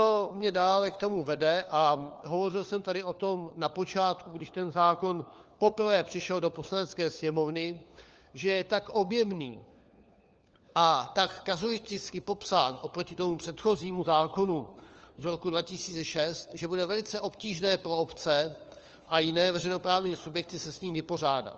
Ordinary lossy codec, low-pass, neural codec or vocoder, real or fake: Opus, 24 kbps; 7.2 kHz; codec, 16 kHz, 4 kbps, FunCodec, trained on LibriTTS, 50 frames a second; fake